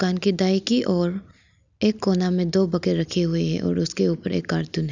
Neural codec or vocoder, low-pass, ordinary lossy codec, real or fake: none; 7.2 kHz; none; real